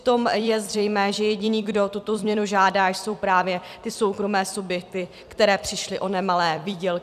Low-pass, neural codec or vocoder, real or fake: 14.4 kHz; vocoder, 44.1 kHz, 128 mel bands every 256 samples, BigVGAN v2; fake